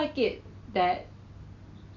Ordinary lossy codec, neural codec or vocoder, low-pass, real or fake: none; none; 7.2 kHz; real